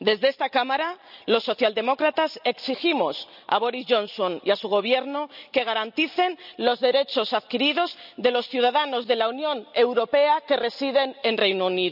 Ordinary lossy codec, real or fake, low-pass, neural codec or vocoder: none; real; 5.4 kHz; none